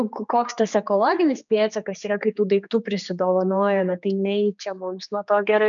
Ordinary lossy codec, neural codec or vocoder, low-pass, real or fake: MP3, 96 kbps; codec, 16 kHz, 4 kbps, X-Codec, HuBERT features, trained on general audio; 7.2 kHz; fake